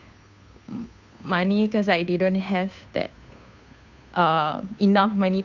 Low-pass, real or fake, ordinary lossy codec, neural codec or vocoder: 7.2 kHz; fake; none; codec, 16 kHz, 2 kbps, FunCodec, trained on Chinese and English, 25 frames a second